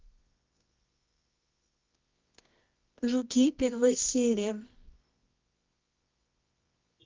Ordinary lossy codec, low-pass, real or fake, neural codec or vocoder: Opus, 24 kbps; 7.2 kHz; fake; codec, 24 kHz, 0.9 kbps, WavTokenizer, medium music audio release